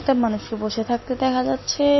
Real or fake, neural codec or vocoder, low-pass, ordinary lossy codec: real; none; 7.2 kHz; MP3, 24 kbps